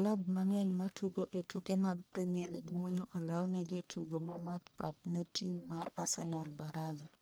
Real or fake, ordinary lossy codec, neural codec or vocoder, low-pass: fake; none; codec, 44.1 kHz, 1.7 kbps, Pupu-Codec; none